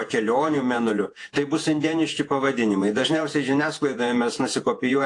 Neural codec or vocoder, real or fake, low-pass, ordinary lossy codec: vocoder, 48 kHz, 128 mel bands, Vocos; fake; 10.8 kHz; AAC, 48 kbps